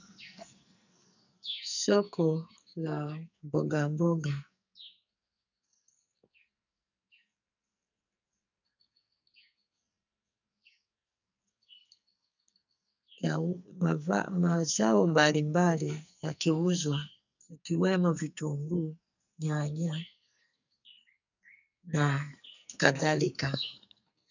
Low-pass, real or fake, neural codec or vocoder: 7.2 kHz; fake; codec, 44.1 kHz, 2.6 kbps, SNAC